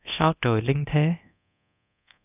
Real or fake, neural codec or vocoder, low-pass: fake; codec, 24 kHz, 0.9 kbps, DualCodec; 3.6 kHz